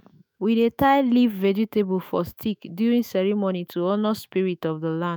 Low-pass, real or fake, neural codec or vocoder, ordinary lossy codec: none; fake; autoencoder, 48 kHz, 128 numbers a frame, DAC-VAE, trained on Japanese speech; none